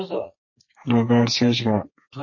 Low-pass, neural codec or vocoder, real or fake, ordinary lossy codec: 7.2 kHz; codec, 32 kHz, 1.9 kbps, SNAC; fake; MP3, 32 kbps